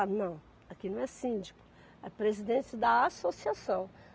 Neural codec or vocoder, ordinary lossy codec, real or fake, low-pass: none; none; real; none